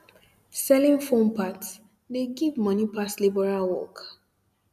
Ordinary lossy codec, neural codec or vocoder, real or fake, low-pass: none; none; real; 14.4 kHz